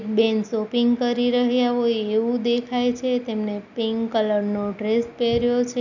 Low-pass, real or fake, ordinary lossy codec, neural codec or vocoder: 7.2 kHz; real; none; none